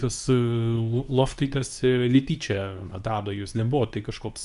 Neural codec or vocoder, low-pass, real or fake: codec, 24 kHz, 0.9 kbps, WavTokenizer, medium speech release version 2; 10.8 kHz; fake